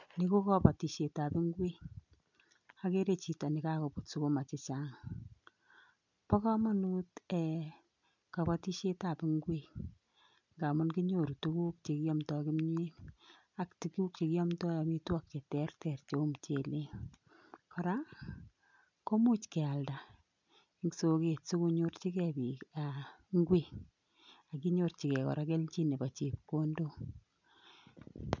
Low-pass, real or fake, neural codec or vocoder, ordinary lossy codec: 7.2 kHz; real; none; none